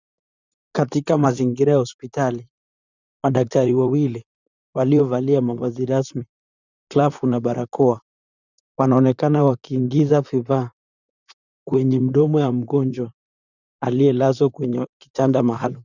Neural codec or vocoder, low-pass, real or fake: vocoder, 44.1 kHz, 128 mel bands, Pupu-Vocoder; 7.2 kHz; fake